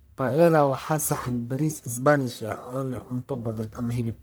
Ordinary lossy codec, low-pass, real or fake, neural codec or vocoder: none; none; fake; codec, 44.1 kHz, 1.7 kbps, Pupu-Codec